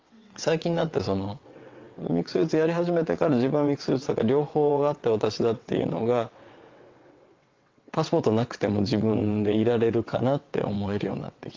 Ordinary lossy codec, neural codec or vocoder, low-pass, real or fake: Opus, 32 kbps; vocoder, 22.05 kHz, 80 mel bands, WaveNeXt; 7.2 kHz; fake